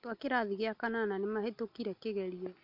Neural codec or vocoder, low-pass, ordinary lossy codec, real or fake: none; 5.4 kHz; none; real